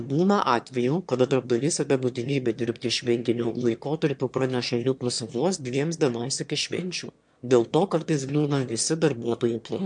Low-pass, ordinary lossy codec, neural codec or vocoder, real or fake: 9.9 kHz; MP3, 64 kbps; autoencoder, 22.05 kHz, a latent of 192 numbers a frame, VITS, trained on one speaker; fake